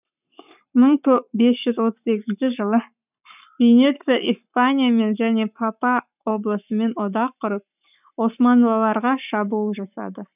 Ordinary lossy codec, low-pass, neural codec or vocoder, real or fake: none; 3.6 kHz; codec, 44.1 kHz, 7.8 kbps, Pupu-Codec; fake